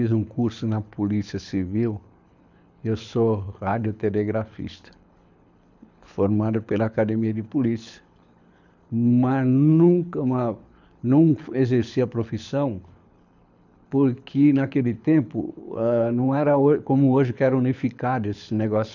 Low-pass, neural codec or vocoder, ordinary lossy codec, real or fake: 7.2 kHz; codec, 24 kHz, 6 kbps, HILCodec; none; fake